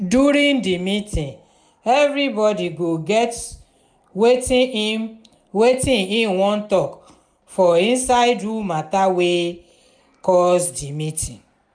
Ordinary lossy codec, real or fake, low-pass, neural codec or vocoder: none; real; 9.9 kHz; none